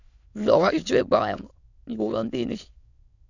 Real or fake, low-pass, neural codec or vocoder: fake; 7.2 kHz; autoencoder, 22.05 kHz, a latent of 192 numbers a frame, VITS, trained on many speakers